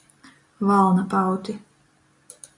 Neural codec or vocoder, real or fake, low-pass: none; real; 10.8 kHz